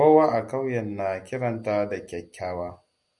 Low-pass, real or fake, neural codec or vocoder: 10.8 kHz; real; none